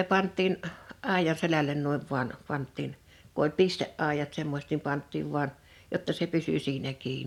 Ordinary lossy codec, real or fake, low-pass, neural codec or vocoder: none; real; 19.8 kHz; none